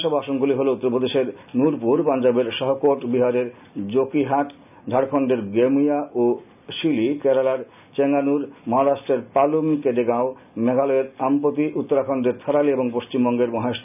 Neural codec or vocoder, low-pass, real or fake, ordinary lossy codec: none; 3.6 kHz; real; none